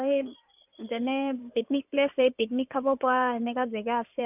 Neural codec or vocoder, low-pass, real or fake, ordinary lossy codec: codec, 16 kHz in and 24 kHz out, 2.2 kbps, FireRedTTS-2 codec; 3.6 kHz; fake; none